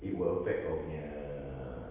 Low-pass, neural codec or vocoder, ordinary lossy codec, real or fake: 3.6 kHz; none; Opus, 32 kbps; real